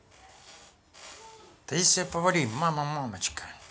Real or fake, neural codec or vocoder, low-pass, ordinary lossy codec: real; none; none; none